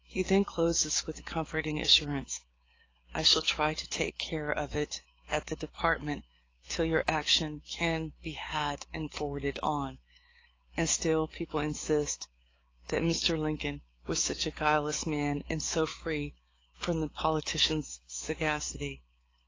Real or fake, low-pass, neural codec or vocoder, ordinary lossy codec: fake; 7.2 kHz; codec, 16 kHz, 6 kbps, DAC; AAC, 32 kbps